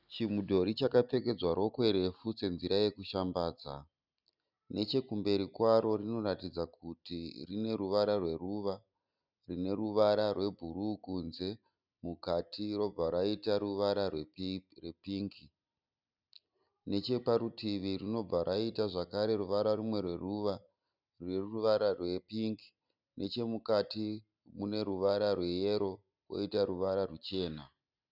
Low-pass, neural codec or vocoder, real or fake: 5.4 kHz; none; real